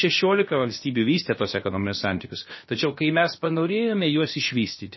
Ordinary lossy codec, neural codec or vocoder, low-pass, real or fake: MP3, 24 kbps; codec, 16 kHz, about 1 kbps, DyCAST, with the encoder's durations; 7.2 kHz; fake